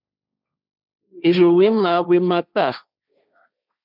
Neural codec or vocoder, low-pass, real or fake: codec, 16 kHz, 1.1 kbps, Voila-Tokenizer; 5.4 kHz; fake